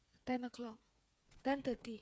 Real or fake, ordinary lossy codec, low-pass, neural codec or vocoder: fake; none; none; codec, 16 kHz, 8 kbps, FreqCodec, smaller model